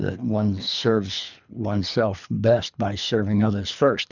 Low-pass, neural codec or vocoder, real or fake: 7.2 kHz; codec, 24 kHz, 3 kbps, HILCodec; fake